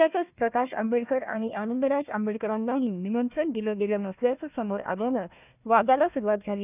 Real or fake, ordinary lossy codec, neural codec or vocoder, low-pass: fake; none; codec, 16 kHz, 1 kbps, FunCodec, trained on Chinese and English, 50 frames a second; 3.6 kHz